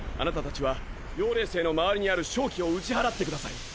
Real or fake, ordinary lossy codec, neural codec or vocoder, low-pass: real; none; none; none